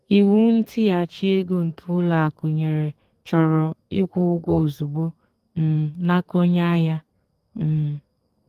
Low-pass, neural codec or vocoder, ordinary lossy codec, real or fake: 14.4 kHz; codec, 32 kHz, 1.9 kbps, SNAC; Opus, 24 kbps; fake